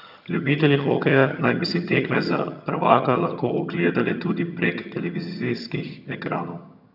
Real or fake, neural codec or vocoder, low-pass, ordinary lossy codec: fake; vocoder, 22.05 kHz, 80 mel bands, HiFi-GAN; 5.4 kHz; none